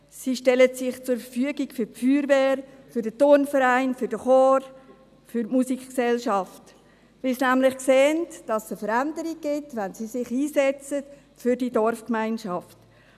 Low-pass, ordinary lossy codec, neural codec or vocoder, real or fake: 14.4 kHz; none; none; real